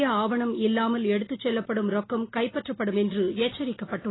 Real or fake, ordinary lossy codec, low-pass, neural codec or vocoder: real; AAC, 16 kbps; 7.2 kHz; none